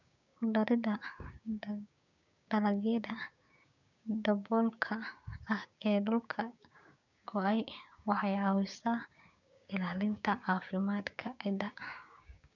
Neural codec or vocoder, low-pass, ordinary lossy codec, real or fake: codec, 16 kHz, 6 kbps, DAC; 7.2 kHz; none; fake